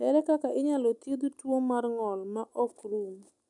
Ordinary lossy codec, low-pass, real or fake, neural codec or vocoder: none; 10.8 kHz; real; none